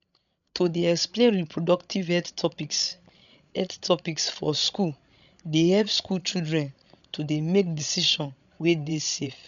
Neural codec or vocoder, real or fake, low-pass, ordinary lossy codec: codec, 16 kHz, 16 kbps, FreqCodec, larger model; fake; 7.2 kHz; none